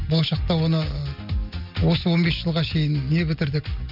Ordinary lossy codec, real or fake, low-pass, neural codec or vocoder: none; real; 5.4 kHz; none